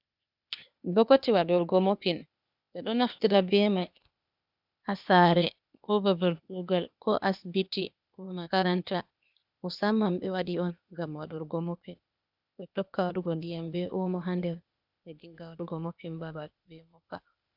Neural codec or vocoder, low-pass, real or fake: codec, 16 kHz, 0.8 kbps, ZipCodec; 5.4 kHz; fake